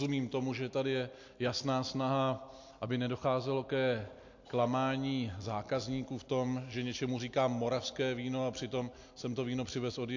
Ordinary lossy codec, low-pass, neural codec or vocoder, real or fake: AAC, 48 kbps; 7.2 kHz; none; real